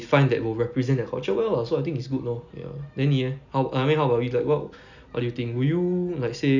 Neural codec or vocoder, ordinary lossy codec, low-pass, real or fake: none; none; 7.2 kHz; real